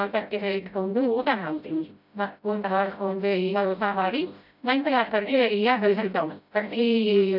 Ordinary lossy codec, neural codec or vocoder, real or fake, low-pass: none; codec, 16 kHz, 0.5 kbps, FreqCodec, smaller model; fake; 5.4 kHz